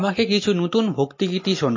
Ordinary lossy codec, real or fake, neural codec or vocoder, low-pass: MP3, 32 kbps; real; none; 7.2 kHz